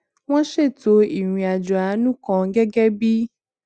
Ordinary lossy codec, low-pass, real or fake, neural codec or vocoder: Opus, 64 kbps; 9.9 kHz; real; none